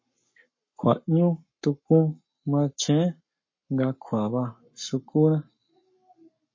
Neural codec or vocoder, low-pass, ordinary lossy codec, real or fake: codec, 44.1 kHz, 7.8 kbps, Pupu-Codec; 7.2 kHz; MP3, 32 kbps; fake